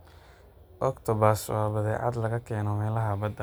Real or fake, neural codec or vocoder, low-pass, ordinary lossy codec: real; none; none; none